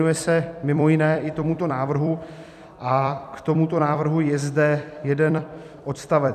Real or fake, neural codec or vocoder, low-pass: fake; vocoder, 44.1 kHz, 128 mel bands every 256 samples, BigVGAN v2; 14.4 kHz